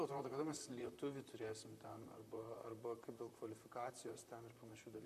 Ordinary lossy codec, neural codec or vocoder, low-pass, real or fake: MP3, 96 kbps; vocoder, 44.1 kHz, 128 mel bands, Pupu-Vocoder; 14.4 kHz; fake